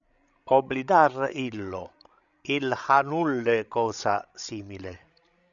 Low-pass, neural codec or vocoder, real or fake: 7.2 kHz; codec, 16 kHz, 16 kbps, FreqCodec, larger model; fake